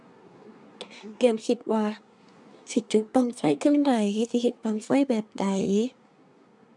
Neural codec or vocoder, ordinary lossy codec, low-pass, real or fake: codec, 24 kHz, 1 kbps, SNAC; none; 10.8 kHz; fake